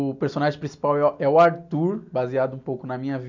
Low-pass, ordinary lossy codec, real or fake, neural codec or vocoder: 7.2 kHz; none; real; none